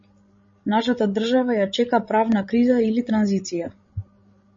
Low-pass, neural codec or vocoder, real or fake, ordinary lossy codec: 7.2 kHz; codec, 16 kHz, 16 kbps, FreqCodec, larger model; fake; MP3, 32 kbps